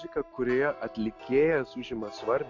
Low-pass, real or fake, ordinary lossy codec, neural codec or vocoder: 7.2 kHz; real; AAC, 48 kbps; none